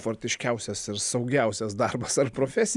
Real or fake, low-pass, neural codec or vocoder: real; 10.8 kHz; none